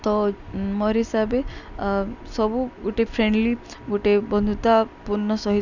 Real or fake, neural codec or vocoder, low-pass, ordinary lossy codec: real; none; 7.2 kHz; none